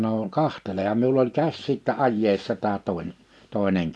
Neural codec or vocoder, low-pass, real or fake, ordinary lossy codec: none; none; real; none